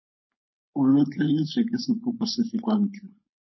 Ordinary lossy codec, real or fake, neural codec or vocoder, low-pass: MP3, 24 kbps; fake; codec, 16 kHz, 4.8 kbps, FACodec; 7.2 kHz